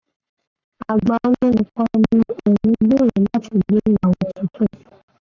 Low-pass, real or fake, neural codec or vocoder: 7.2 kHz; fake; codec, 44.1 kHz, 7.8 kbps, Pupu-Codec